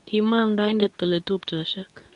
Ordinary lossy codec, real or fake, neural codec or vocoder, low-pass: none; fake; codec, 24 kHz, 0.9 kbps, WavTokenizer, medium speech release version 2; 10.8 kHz